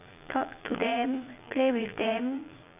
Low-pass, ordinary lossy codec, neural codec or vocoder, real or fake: 3.6 kHz; AAC, 32 kbps; vocoder, 22.05 kHz, 80 mel bands, Vocos; fake